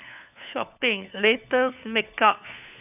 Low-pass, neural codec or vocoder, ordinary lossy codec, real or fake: 3.6 kHz; codec, 16 kHz, 4 kbps, FunCodec, trained on LibriTTS, 50 frames a second; none; fake